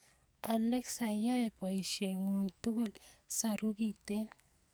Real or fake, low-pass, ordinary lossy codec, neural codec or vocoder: fake; none; none; codec, 44.1 kHz, 2.6 kbps, SNAC